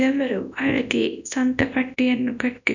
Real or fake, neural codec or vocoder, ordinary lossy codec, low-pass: fake; codec, 24 kHz, 0.9 kbps, WavTokenizer, large speech release; none; 7.2 kHz